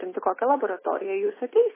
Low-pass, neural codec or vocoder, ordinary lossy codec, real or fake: 3.6 kHz; none; MP3, 16 kbps; real